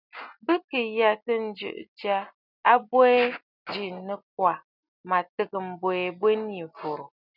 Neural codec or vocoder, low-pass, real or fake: none; 5.4 kHz; real